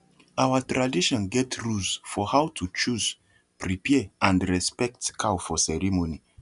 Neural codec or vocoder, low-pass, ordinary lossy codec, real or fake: none; 10.8 kHz; none; real